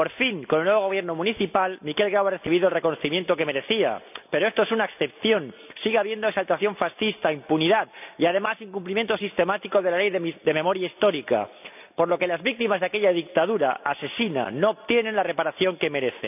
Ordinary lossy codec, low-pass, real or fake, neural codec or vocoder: none; 3.6 kHz; real; none